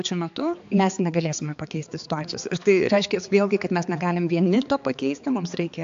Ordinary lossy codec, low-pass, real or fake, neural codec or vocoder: AAC, 64 kbps; 7.2 kHz; fake; codec, 16 kHz, 4 kbps, X-Codec, HuBERT features, trained on balanced general audio